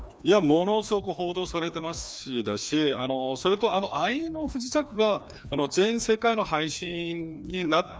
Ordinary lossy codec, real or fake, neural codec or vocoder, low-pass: none; fake; codec, 16 kHz, 2 kbps, FreqCodec, larger model; none